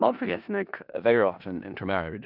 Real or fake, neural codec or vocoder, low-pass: fake; codec, 16 kHz in and 24 kHz out, 0.4 kbps, LongCat-Audio-Codec, four codebook decoder; 5.4 kHz